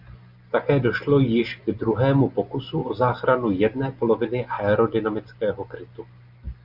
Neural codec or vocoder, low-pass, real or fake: none; 5.4 kHz; real